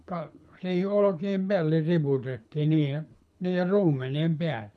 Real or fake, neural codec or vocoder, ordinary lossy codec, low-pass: fake; codec, 24 kHz, 6 kbps, HILCodec; none; none